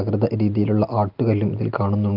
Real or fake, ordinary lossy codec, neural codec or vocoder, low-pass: real; Opus, 32 kbps; none; 5.4 kHz